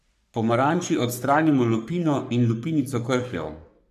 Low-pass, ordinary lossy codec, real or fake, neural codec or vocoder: 14.4 kHz; none; fake; codec, 44.1 kHz, 3.4 kbps, Pupu-Codec